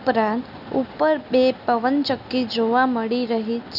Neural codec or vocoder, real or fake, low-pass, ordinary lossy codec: none; real; 5.4 kHz; none